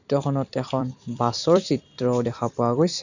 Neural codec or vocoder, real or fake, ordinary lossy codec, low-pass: vocoder, 44.1 kHz, 128 mel bands every 256 samples, BigVGAN v2; fake; none; 7.2 kHz